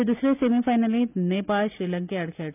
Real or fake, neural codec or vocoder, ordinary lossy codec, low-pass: real; none; none; 3.6 kHz